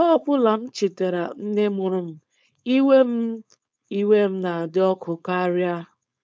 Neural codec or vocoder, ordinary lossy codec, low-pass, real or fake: codec, 16 kHz, 4.8 kbps, FACodec; none; none; fake